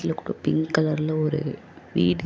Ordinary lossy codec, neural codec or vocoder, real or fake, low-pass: none; none; real; none